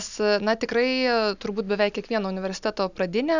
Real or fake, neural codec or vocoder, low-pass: real; none; 7.2 kHz